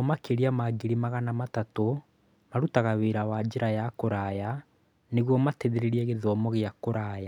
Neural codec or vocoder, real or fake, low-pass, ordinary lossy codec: none; real; 19.8 kHz; none